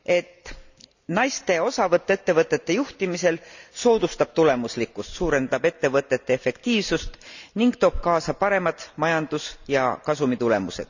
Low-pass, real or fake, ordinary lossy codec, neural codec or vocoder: 7.2 kHz; real; none; none